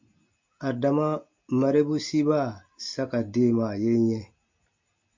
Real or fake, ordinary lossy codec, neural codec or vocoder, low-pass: real; MP3, 48 kbps; none; 7.2 kHz